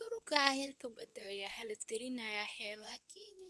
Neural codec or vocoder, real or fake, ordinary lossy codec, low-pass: codec, 24 kHz, 0.9 kbps, WavTokenizer, medium speech release version 2; fake; none; none